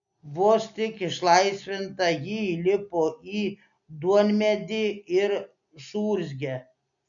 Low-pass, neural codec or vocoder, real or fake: 7.2 kHz; none; real